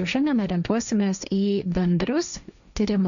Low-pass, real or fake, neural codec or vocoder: 7.2 kHz; fake; codec, 16 kHz, 1.1 kbps, Voila-Tokenizer